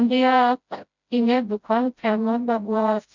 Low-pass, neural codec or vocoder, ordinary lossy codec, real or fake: 7.2 kHz; codec, 16 kHz, 0.5 kbps, FreqCodec, smaller model; none; fake